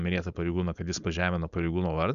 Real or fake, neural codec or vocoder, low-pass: fake; codec, 16 kHz, 4.8 kbps, FACodec; 7.2 kHz